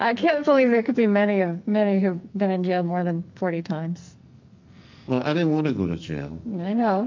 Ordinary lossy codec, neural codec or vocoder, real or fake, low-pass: MP3, 64 kbps; codec, 44.1 kHz, 2.6 kbps, SNAC; fake; 7.2 kHz